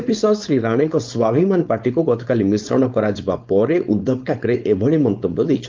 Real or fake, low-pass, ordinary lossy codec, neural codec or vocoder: fake; 7.2 kHz; Opus, 24 kbps; codec, 16 kHz, 4.8 kbps, FACodec